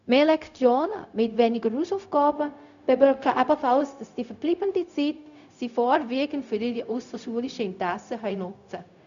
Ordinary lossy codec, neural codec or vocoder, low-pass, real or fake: none; codec, 16 kHz, 0.4 kbps, LongCat-Audio-Codec; 7.2 kHz; fake